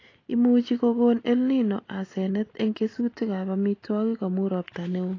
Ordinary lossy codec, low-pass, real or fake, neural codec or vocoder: AAC, 32 kbps; 7.2 kHz; real; none